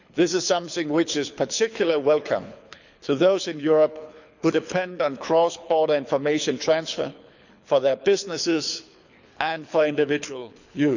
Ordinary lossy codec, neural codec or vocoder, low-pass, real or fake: none; codec, 24 kHz, 6 kbps, HILCodec; 7.2 kHz; fake